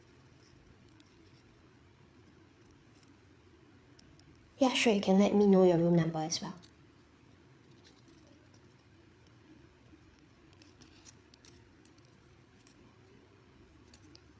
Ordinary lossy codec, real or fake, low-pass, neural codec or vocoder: none; fake; none; codec, 16 kHz, 8 kbps, FreqCodec, larger model